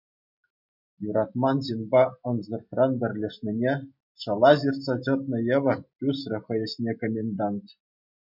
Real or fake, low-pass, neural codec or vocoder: real; 5.4 kHz; none